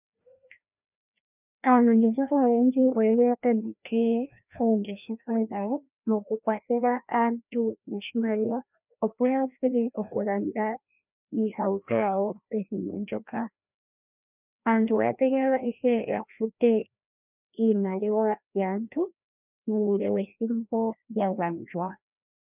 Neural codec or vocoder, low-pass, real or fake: codec, 16 kHz, 1 kbps, FreqCodec, larger model; 3.6 kHz; fake